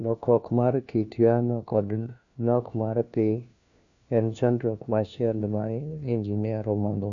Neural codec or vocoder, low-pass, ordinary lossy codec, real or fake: codec, 16 kHz, 1 kbps, FunCodec, trained on LibriTTS, 50 frames a second; 7.2 kHz; none; fake